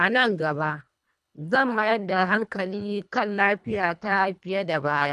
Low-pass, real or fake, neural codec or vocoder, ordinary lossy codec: none; fake; codec, 24 kHz, 1.5 kbps, HILCodec; none